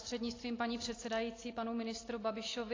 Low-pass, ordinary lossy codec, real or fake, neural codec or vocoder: 7.2 kHz; AAC, 32 kbps; real; none